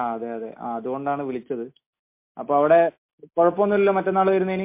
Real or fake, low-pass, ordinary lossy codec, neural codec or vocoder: real; 3.6 kHz; MP3, 24 kbps; none